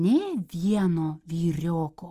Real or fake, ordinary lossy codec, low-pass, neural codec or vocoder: real; Opus, 32 kbps; 14.4 kHz; none